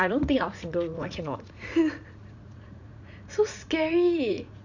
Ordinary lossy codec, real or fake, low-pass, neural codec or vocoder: none; fake; 7.2 kHz; vocoder, 44.1 kHz, 80 mel bands, Vocos